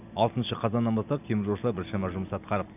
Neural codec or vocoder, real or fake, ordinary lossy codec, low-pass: none; real; none; 3.6 kHz